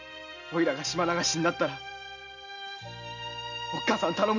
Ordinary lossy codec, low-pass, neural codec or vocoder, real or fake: none; 7.2 kHz; none; real